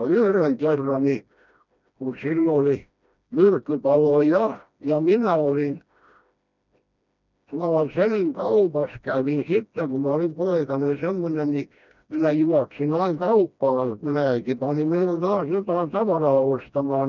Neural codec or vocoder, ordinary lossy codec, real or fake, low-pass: codec, 16 kHz, 1 kbps, FreqCodec, smaller model; none; fake; 7.2 kHz